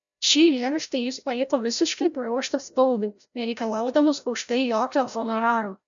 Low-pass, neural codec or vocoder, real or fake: 7.2 kHz; codec, 16 kHz, 0.5 kbps, FreqCodec, larger model; fake